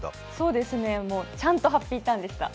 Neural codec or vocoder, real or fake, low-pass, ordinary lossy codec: none; real; none; none